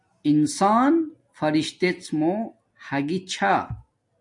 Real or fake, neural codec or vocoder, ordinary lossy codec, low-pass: real; none; MP3, 64 kbps; 10.8 kHz